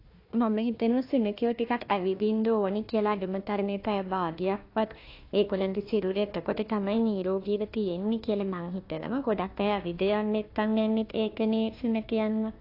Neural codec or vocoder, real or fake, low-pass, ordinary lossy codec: codec, 16 kHz, 1 kbps, FunCodec, trained on Chinese and English, 50 frames a second; fake; 5.4 kHz; AAC, 32 kbps